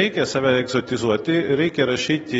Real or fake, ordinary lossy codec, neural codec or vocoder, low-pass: real; AAC, 24 kbps; none; 19.8 kHz